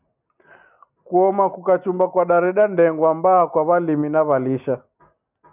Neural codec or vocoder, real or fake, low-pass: none; real; 3.6 kHz